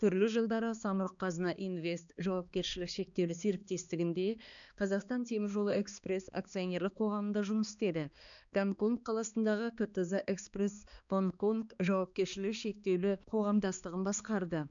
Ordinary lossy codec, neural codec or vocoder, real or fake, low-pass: none; codec, 16 kHz, 2 kbps, X-Codec, HuBERT features, trained on balanced general audio; fake; 7.2 kHz